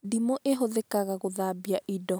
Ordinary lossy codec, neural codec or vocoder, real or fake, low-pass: none; none; real; none